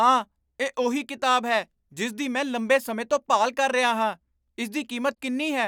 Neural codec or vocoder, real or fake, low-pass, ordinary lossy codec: none; real; none; none